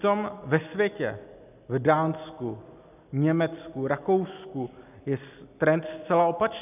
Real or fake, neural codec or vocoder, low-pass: real; none; 3.6 kHz